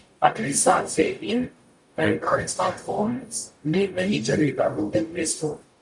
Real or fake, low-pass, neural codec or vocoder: fake; 10.8 kHz; codec, 44.1 kHz, 0.9 kbps, DAC